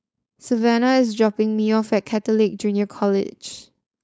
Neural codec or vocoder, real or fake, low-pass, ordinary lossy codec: codec, 16 kHz, 4.8 kbps, FACodec; fake; none; none